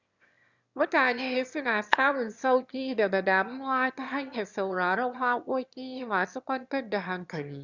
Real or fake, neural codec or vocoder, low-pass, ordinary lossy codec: fake; autoencoder, 22.05 kHz, a latent of 192 numbers a frame, VITS, trained on one speaker; 7.2 kHz; Opus, 64 kbps